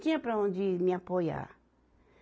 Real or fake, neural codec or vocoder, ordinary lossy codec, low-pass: real; none; none; none